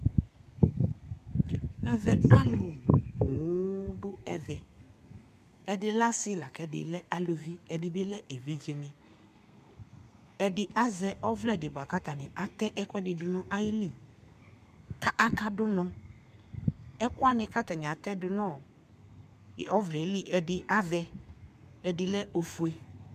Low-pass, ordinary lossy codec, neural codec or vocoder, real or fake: 14.4 kHz; MP3, 96 kbps; codec, 32 kHz, 1.9 kbps, SNAC; fake